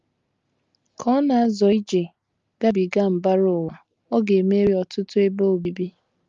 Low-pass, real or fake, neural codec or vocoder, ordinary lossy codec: 7.2 kHz; real; none; Opus, 32 kbps